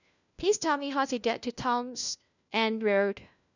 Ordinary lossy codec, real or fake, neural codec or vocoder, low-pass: none; fake; codec, 16 kHz, 1 kbps, FunCodec, trained on LibriTTS, 50 frames a second; 7.2 kHz